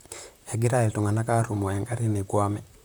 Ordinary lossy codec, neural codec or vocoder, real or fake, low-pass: none; vocoder, 44.1 kHz, 128 mel bands, Pupu-Vocoder; fake; none